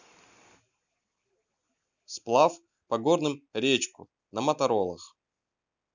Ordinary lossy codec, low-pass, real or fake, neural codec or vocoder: none; 7.2 kHz; real; none